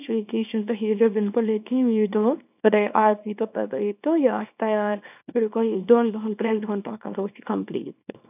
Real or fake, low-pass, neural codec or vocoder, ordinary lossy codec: fake; 3.6 kHz; codec, 24 kHz, 0.9 kbps, WavTokenizer, small release; none